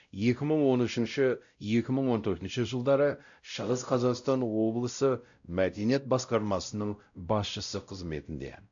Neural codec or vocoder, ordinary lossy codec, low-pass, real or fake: codec, 16 kHz, 0.5 kbps, X-Codec, WavLM features, trained on Multilingual LibriSpeech; AAC, 64 kbps; 7.2 kHz; fake